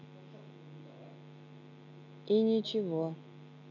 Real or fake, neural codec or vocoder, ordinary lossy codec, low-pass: real; none; none; 7.2 kHz